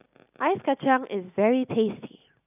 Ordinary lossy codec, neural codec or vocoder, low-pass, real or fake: none; none; 3.6 kHz; real